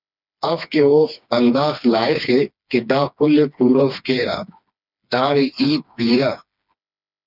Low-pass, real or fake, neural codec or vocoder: 5.4 kHz; fake; codec, 16 kHz, 2 kbps, FreqCodec, smaller model